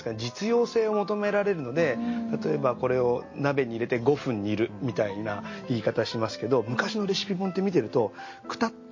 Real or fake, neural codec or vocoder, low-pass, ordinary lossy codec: real; none; 7.2 kHz; MP3, 32 kbps